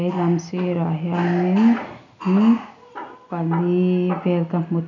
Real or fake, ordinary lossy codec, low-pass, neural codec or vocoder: real; none; 7.2 kHz; none